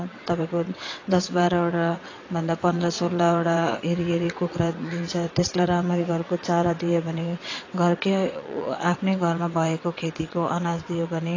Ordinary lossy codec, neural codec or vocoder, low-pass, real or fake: AAC, 32 kbps; vocoder, 22.05 kHz, 80 mel bands, WaveNeXt; 7.2 kHz; fake